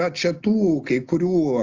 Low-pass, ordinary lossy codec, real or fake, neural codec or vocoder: 7.2 kHz; Opus, 32 kbps; real; none